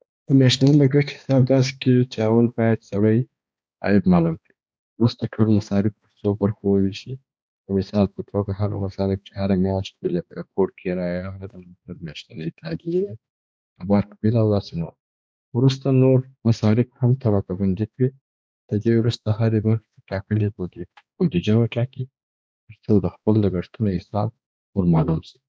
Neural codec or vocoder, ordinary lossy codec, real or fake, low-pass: codec, 16 kHz, 2 kbps, X-Codec, HuBERT features, trained on balanced general audio; none; fake; none